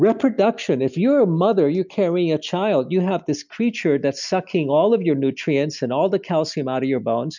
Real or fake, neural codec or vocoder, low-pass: real; none; 7.2 kHz